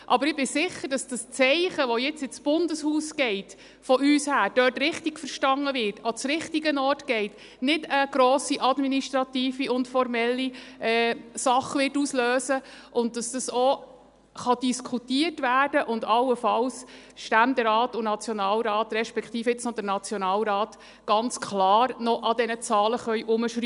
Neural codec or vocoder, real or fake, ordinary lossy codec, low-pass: none; real; none; 10.8 kHz